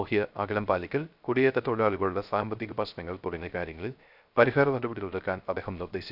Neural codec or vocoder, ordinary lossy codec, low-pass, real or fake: codec, 16 kHz, 0.3 kbps, FocalCodec; none; 5.4 kHz; fake